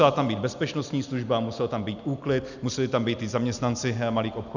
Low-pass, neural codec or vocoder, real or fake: 7.2 kHz; none; real